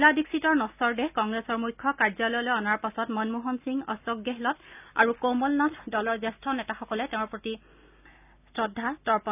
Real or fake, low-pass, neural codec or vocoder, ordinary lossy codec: real; 3.6 kHz; none; none